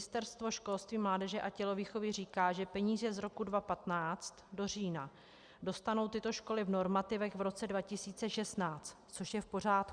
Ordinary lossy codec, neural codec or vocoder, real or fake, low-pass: Opus, 64 kbps; none; real; 9.9 kHz